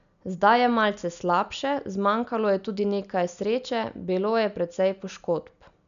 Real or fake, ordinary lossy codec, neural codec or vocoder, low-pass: real; none; none; 7.2 kHz